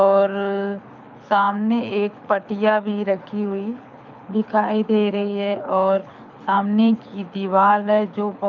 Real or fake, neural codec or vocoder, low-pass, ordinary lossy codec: fake; codec, 24 kHz, 6 kbps, HILCodec; 7.2 kHz; none